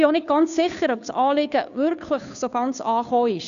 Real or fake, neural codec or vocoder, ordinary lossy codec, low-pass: fake; codec, 16 kHz, 2 kbps, FunCodec, trained on Chinese and English, 25 frames a second; none; 7.2 kHz